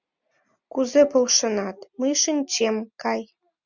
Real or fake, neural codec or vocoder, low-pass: real; none; 7.2 kHz